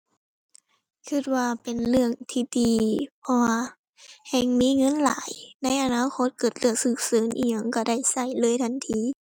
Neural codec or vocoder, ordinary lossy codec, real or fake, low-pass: none; none; real; 19.8 kHz